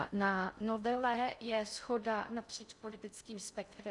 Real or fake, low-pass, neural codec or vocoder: fake; 10.8 kHz; codec, 16 kHz in and 24 kHz out, 0.6 kbps, FocalCodec, streaming, 4096 codes